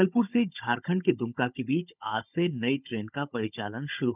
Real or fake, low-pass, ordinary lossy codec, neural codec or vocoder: fake; 3.6 kHz; none; codec, 16 kHz, 16 kbps, FunCodec, trained on Chinese and English, 50 frames a second